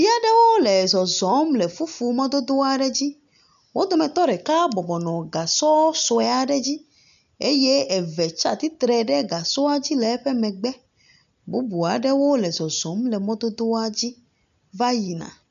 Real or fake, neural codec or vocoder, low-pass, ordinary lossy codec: real; none; 7.2 kHz; MP3, 96 kbps